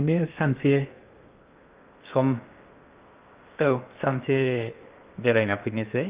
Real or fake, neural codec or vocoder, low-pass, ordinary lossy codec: fake; codec, 16 kHz in and 24 kHz out, 0.6 kbps, FocalCodec, streaming, 2048 codes; 3.6 kHz; Opus, 32 kbps